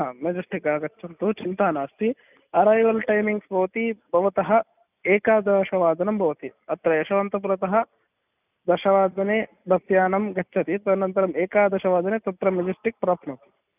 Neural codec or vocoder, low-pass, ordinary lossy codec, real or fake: none; 3.6 kHz; none; real